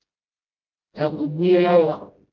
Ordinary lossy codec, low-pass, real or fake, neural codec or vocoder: Opus, 32 kbps; 7.2 kHz; fake; codec, 16 kHz, 0.5 kbps, FreqCodec, smaller model